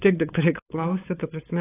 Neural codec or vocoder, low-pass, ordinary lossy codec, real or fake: codec, 16 kHz, 8 kbps, FunCodec, trained on LibriTTS, 25 frames a second; 3.6 kHz; AAC, 16 kbps; fake